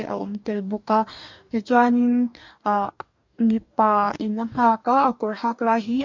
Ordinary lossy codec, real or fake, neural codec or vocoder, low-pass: MP3, 48 kbps; fake; codec, 44.1 kHz, 2.6 kbps, DAC; 7.2 kHz